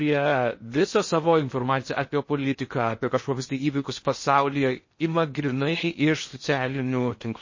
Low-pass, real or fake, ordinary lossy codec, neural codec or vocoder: 7.2 kHz; fake; MP3, 32 kbps; codec, 16 kHz in and 24 kHz out, 0.6 kbps, FocalCodec, streaming, 2048 codes